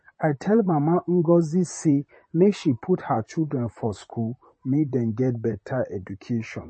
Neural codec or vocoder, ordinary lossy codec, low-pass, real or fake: vocoder, 44.1 kHz, 128 mel bands, Pupu-Vocoder; MP3, 32 kbps; 9.9 kHz; fake